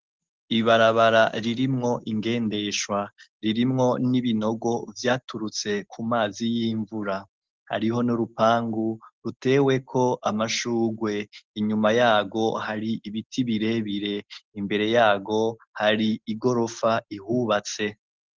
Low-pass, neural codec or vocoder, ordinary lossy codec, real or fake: 7.2 kHz; none; Opus, 16 kbps; real